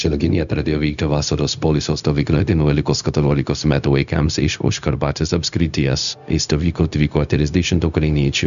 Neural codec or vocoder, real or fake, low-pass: codec, 16 kHz, 0.4 kbps, LongCat-Audio-Codec; fake; 7.2 kHz